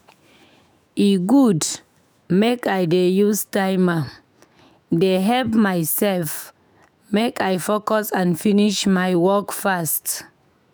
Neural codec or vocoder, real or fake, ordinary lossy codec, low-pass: autoencoder, 48 kHz, 128 numbers a frame, DAC-VAE, trained on Japanese speech; fake; none; none